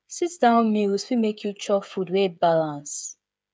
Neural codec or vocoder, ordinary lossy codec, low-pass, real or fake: codec, 16 kHz, 8 kbps, FreqCodec, smaller model; none; none; fake